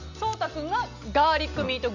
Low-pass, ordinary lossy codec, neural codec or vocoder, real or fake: 7.2 kHz; none; none; real